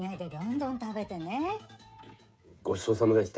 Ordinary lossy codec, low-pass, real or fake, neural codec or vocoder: none; none; fake; codec, 16 kHz, 16 kbps, FreqCodec, smaller model